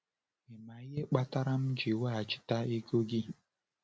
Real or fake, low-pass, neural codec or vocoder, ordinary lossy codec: real; none; none; none